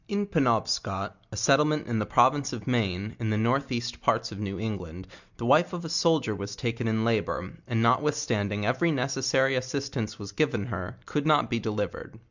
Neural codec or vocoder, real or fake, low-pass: none; real; 7.2 kHz